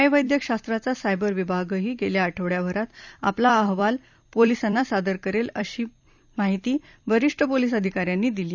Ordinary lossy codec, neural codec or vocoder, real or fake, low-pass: none; vocoder, 44.1 kHz, 128 mel bands every 256 samples, BigVGAN v2; fake; 7.2 kHz